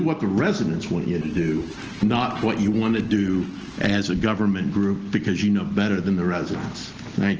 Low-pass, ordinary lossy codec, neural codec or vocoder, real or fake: 7.2 kHz; Opus, 16 kbps; none; real